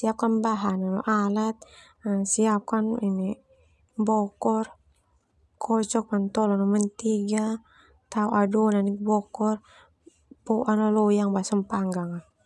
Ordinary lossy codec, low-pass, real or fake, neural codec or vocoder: none; none; real; none